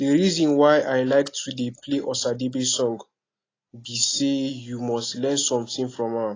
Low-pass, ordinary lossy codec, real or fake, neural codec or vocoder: 7.2 kHz; AAC, 32 kbps; real; none